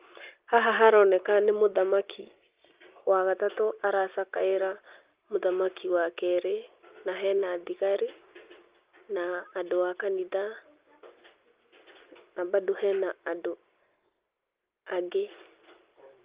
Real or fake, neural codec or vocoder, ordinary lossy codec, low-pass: real; none; Opus, 32 kbps; 3.6 kHz